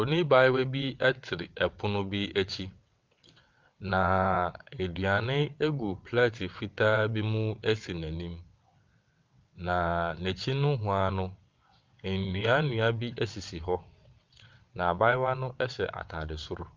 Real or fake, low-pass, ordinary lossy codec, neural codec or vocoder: fake; 7.2 kHz; Opus, 24 kbps; vocoder, 22.05 kHz, 80 mel bands, WaveNeXt